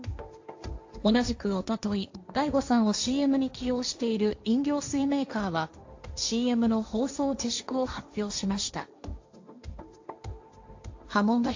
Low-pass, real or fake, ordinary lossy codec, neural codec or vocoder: 7.2 kHz; fake; AAC, 48 kbps; codec, 16 kHz, 1.1 kbps, Voila-Tokenizer